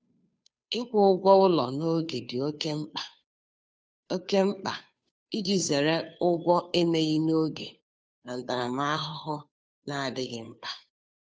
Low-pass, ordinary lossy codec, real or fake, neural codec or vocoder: none; none; fake; codec, 16 kHz, 2 kbps, FunCodec, trained on Chinese and English, 25 frames a second